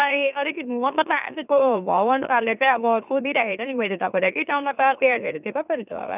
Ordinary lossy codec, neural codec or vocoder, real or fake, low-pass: none; autoencoder, 44.1 kHz, a latent of 192 numbers a frame, MeloTTS; fake; 3.6 kHz